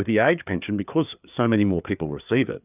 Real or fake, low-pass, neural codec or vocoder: fake; 3.6 kHz; codec, 16 kHz, 4 kbps, FreqCodec, larger model